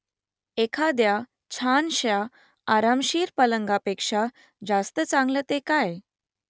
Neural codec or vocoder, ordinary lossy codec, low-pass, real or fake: none; none; none; real